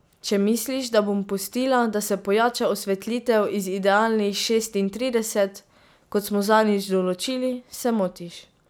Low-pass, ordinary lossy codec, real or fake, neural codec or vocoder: none; none; real; none